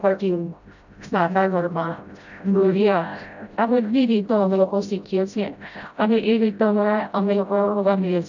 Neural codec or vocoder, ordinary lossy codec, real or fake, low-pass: codec, 16 kHz, 0.5 kbps, FreqCodec, smaller model; none; fake; 7.2 kHz